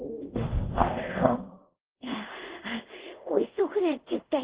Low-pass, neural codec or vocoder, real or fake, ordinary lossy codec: 3.6 kHz; codec, 24 kHz, 0.5 kbps, DualCodec; fake; Opus, 16 kbps